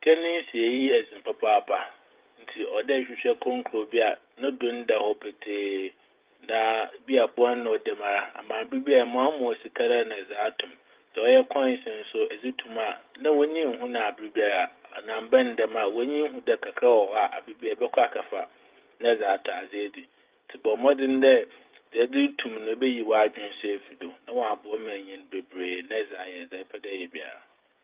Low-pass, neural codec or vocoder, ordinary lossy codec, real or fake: 3.6 kHz; codec, 16 kHz, 16 kbps, FreqCodec, smaller model; Opus, 32 kbps; fake